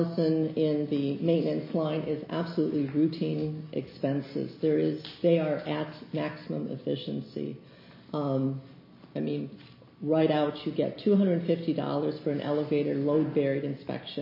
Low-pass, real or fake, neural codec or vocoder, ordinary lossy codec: 5.4 kHz; real; none; MP3, 24 kbps